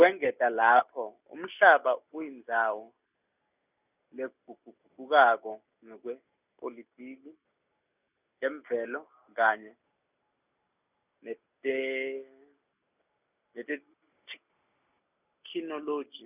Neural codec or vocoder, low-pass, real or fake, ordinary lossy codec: none; 3.6 kHz; real; none